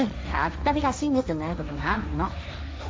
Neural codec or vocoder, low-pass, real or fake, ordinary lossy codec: codec, 16 kHz, 1.1 kbps, Voila-Tokenizer; none; fake; none